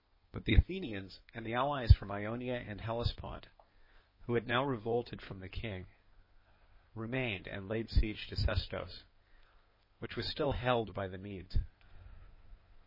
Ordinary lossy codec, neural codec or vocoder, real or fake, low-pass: MP3, 24 kbps; codec, 16 kHz in and 24 kHz out, 2.2 kbps, FireRedTTS-2 codec; fake; 5.4 kHz